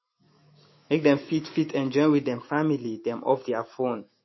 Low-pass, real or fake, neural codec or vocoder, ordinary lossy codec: 7.2 kHz; fake; autoencoder, 48 kHz, 128 numbers a frame, DAC-VAE, trained on Japanese speech; MP3, 24 kbps